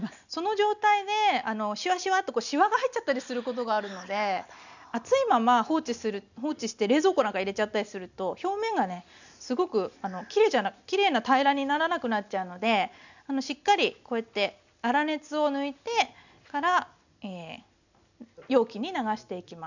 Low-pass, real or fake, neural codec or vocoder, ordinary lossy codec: 7.2 kHz; real; none; none